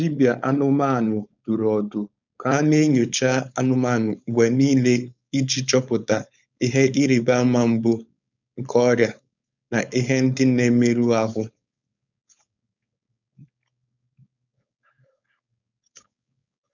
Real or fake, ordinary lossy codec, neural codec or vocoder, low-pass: fake; none; codec, 16 kHz, 4.8 kbps, FACodec; 7.2 kHz